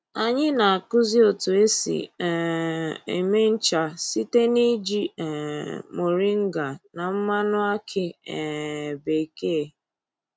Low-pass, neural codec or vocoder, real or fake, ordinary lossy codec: none; none; real; none